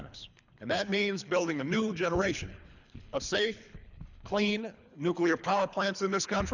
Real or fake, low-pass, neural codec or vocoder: fake; 7.2 kHz; codec, 24 kHz, 3 kbps, HILCodec